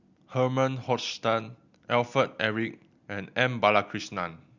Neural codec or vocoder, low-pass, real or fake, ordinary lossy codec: none; 7.2 kHz; real; Opus, 64 kbps